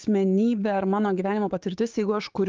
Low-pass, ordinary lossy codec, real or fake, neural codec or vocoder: 7.2 kHz; Opus, 32 kbps; fake; codec, 16 kHz, 6 kbps, DAC